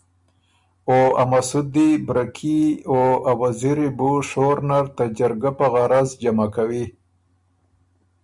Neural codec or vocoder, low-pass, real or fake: none; 10.8 kHz; real